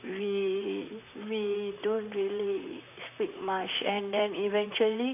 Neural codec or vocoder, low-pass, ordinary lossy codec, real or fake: vocoder, 44.1 kHz, 128 mel bands, Pupu-Vocoder; 3.6 kHz; none; fake